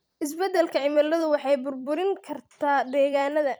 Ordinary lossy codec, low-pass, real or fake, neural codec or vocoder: none; none; fake; vocoder, 44.1 kHz, 128 mel bands every 256 samples, BigVGAN v2